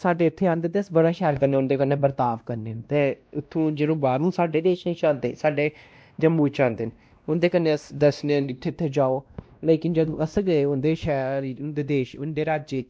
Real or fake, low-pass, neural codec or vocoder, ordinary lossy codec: fake; none; codec, 16 kHz, 1 kbps, X-Codec, WavLM features, trained on Multilingual LibriSpeech; none